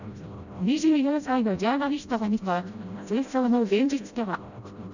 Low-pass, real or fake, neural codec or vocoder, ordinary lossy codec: 7.2 kHz; fake; codec, 16 kHz, 0.5 kbps, FreqCodec, smaller model; none